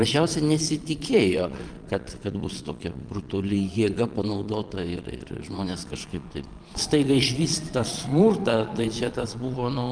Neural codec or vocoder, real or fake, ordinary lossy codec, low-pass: vocoder, 22.05 kHz, 80 mel bands, Vocos; fake; Opus, 32 kbps; 9.9 kHz